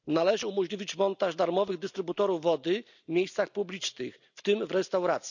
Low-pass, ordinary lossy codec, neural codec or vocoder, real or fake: 7.2 kHz; none; none; real